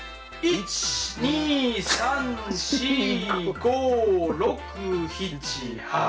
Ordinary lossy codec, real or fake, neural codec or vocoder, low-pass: none; real; none; none